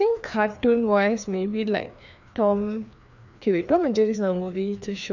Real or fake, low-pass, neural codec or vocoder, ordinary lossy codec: fake; 7.2 kHz; codec, 16 kHz, 2 kbps, FreqCodec, larger model; none